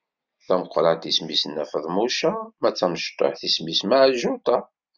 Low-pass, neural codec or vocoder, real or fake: 7.2 kHz; none; real